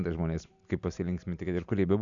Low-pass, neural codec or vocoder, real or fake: 7.2 kHz; none; real